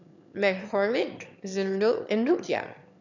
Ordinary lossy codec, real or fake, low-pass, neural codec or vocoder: none; fake; 7.2 kHz; autoencoder, 22.05 kHz, a latent of 192 numbers a frame, VITS, trained on one speaker